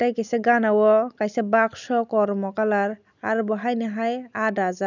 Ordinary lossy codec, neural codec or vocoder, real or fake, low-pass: none; none; real; 7.2 kHz